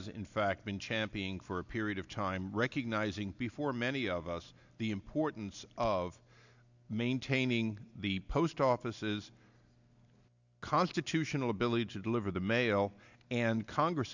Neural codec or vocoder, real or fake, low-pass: none; real; 7.2 kHz